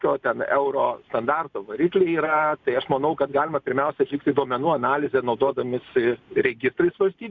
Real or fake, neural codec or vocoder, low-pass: real; none; 7.2 kHz